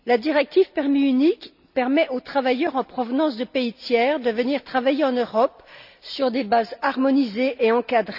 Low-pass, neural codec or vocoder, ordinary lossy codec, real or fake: 5.4 kHz; none; none; real